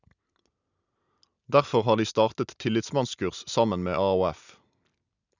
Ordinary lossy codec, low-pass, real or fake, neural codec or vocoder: none; 7.2 kHz; real; none